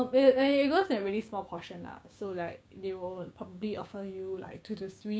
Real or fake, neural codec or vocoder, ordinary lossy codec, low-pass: fake; codec, 16 kHz, 6 kbps, DAC; none; none